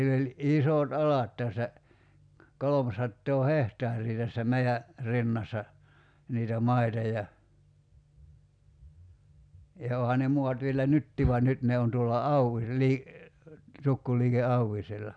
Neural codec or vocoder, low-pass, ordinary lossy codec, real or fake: none; 9.9 kHz; none; real